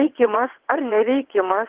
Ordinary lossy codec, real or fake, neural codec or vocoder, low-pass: Opus, 16 kbps; fake; vocoder, 22.05 kHz, 80 mel bands, WaveNeXt; 3.6 kHz